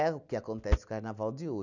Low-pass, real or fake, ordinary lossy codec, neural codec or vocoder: 7.2 kHz; real; none; none